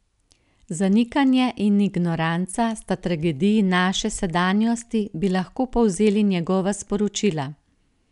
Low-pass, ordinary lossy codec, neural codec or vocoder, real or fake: 10.8 kHz; none; none; real